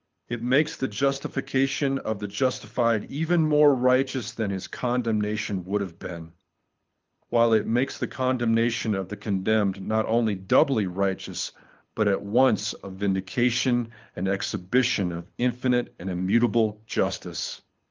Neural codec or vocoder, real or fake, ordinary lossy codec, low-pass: codec, 24 kHz, 6 kbps, HILCodec; fake; Opus, 32 kbps; 7.2 kHz